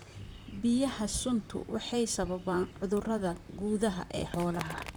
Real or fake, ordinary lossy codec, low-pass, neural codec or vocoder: fake; none; none; vocoder, 44.1 kHz, 128 mel bands, Pupu-Vocoder